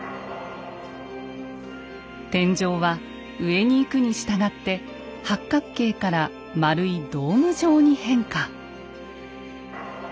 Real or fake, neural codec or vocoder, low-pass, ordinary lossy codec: real; none; none; none